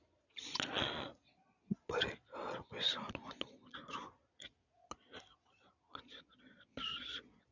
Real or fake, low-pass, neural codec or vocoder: fake; 7.2 kHz; vocoder, 22.05 kHz, 80 mel bands, Vocos